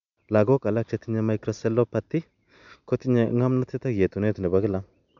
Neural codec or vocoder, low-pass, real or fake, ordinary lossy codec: none; 7.2 kHz; real; none